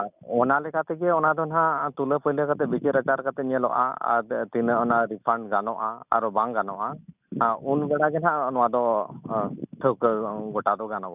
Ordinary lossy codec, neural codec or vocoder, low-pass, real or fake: none; none; 3.6 kHz; real